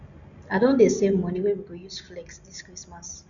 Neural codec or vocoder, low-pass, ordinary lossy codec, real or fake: none; 7.2 kHz; none; real